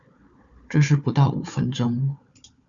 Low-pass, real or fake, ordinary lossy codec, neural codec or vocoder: 7.2 kHz; fake; Opus, 64 kbps; codec, 16 kHz, 4 kbps, FunCodec, trained on Chinese and English, 50 frames a second